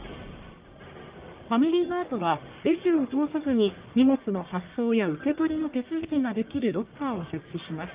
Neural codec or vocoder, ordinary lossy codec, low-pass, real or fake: codec, 44.1 kHz, 1.7 kbps, Pupu-Codec; Opus, 24 kbps; 3.6 kHz; fake